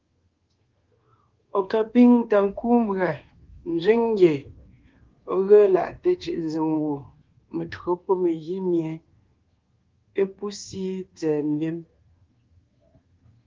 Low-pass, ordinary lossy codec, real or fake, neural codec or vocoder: 7.2 kHz; Opus, 16 kbps; fake; codec, 24 kHz, 1.2 kbps, DualCodec